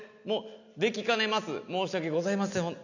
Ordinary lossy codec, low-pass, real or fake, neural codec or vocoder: none; 7.2 kHz; real; none